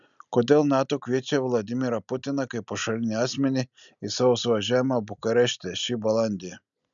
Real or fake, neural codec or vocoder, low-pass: real; none; 7.2 kHz